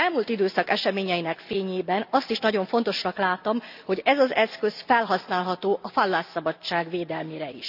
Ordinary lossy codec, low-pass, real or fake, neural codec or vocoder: none; 5.4 kHz; real; none